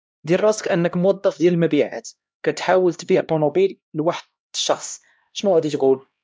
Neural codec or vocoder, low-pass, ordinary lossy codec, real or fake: codec, 16 kHz, 1 kbps, X-Codec, HuBERT features, trained on LibriSpeech; none; none; fake